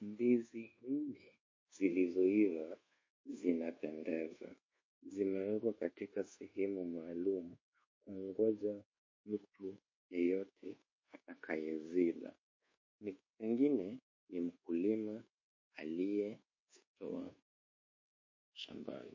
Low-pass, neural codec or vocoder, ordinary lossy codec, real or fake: 7.2 kHz; codec, 24 kHz, 1.2 kbps, DualCodec; MP3, 32 kbps; fake